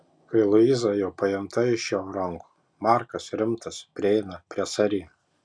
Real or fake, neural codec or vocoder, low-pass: real; none; 9.9 kHz